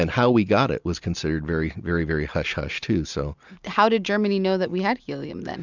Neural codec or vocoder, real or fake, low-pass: none; real; 7.2 kHz